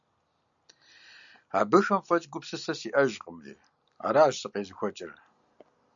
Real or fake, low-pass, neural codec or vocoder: real; 7.2 kHz; none